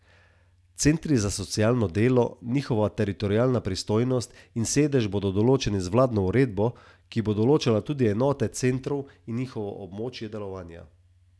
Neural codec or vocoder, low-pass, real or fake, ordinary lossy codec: none; none; real; none